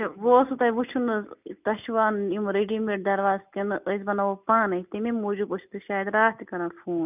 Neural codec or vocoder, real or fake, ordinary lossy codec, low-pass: none; real; none; 3.6 kHz